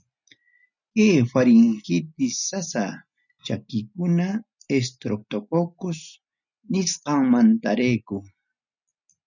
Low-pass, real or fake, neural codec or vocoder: 7.2 kHz; real; none